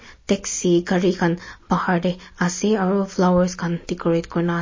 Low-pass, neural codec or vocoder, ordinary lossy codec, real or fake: 7.2 kHz; none; MP3, 32 kbps; real